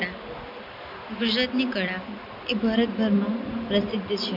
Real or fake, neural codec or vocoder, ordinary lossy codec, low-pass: real; none; MP3, 48 kbps; 5.4 kHz